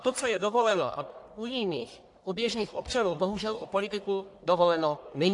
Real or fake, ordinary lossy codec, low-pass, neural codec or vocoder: fake; AAC, 64 kbps; 10.8 kHz; codec, 44.1 kHz, 1.7 kbps, Pupu-Codec